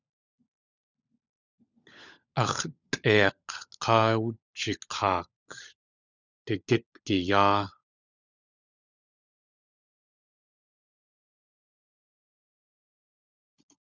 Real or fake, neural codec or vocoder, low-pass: fake; codec, 16 kHz, 16 kbps, FunCodec, trained on LibriTTS, 50 frames a second; 7.2 kHz